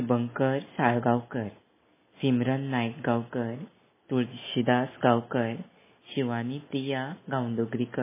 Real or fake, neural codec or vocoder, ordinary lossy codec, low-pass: real; none; MP3, 16 kbps; 3.6 kHz